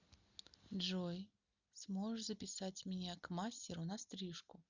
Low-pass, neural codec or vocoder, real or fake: 7.2 kHz; none; real